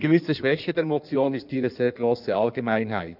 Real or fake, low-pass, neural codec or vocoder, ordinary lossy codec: fake; 5.4 kHz; codec, 16 kHz in and 24 kHz out, 1.1 kbps, FireRedTTS-2 codec; none